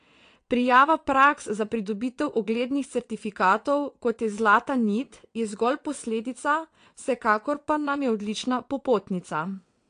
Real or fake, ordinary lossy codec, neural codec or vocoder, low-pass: fake; AAC, 48 kbps; vocoder, 22.05 kHz, 80 mel bands, WaveNeXt; 9.9 kHz